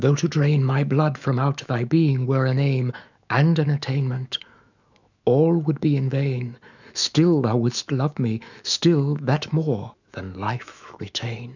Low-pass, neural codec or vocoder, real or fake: 7.2 kHz; autoencoder, 48 kHz, 128 numbers a frame, DAC-VAE, trained on Japanese speech; fake